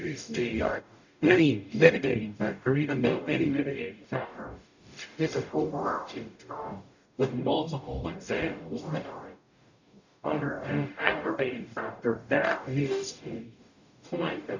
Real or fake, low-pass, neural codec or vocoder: fake; 7.2 kHz; codec, 44.1 kHz, 0.9 kbps, DAC